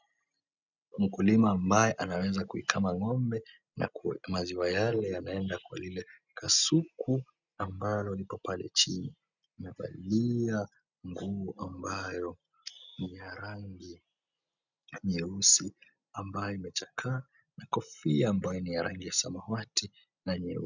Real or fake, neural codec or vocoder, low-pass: real; none; 7.2 kHz